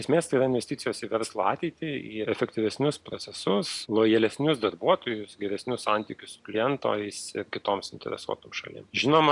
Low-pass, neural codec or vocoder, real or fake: 10.8 kHz; none; real